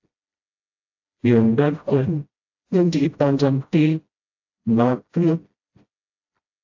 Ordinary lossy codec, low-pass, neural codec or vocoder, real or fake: AAC, 48 kbps; 7.2 kHz; codec, 16 kHz, 0.5 kbps, FreqCodec, smaller model; fake